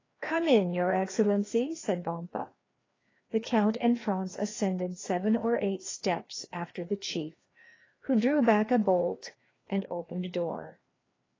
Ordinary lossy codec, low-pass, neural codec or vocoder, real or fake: AAC, 32 kbps; 7.2 kHz; codec, 16 kHz, 2 kbps, FreqCodec, larger model; fake